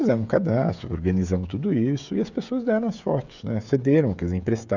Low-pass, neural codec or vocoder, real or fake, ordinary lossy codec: 7.2 kHz; codec, 16 kHz, 16 kbps, FreqCodec, smaller model; fake; none